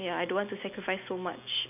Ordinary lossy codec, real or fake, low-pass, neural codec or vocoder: none; real; 3.6 kHz; none